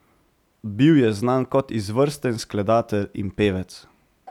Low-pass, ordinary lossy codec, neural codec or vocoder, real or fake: 19.8 kHz; none; none; real